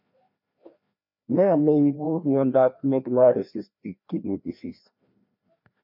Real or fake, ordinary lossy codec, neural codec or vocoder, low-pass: fake; MP3, 48 kbps; codec, 16 kHz, 1 kbps, FreqCodec, larger model; 5.4 kHz